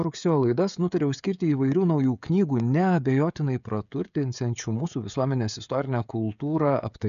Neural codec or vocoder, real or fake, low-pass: codec, 16 kHz, 16 kbps, FreqCodec, smaller model; fake; 7.2 kHz